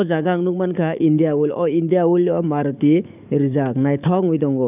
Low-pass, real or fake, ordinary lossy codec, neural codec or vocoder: 3.6 kHz; fake; none; codec, 44.1 kHz, 7.8 kbps, DAC